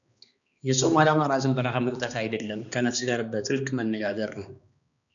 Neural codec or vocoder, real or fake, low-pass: codec, 16 kHz, 2 kbps, X-Codec, HuBERT features, trained on balanced general audio; fake; 7.2 kHz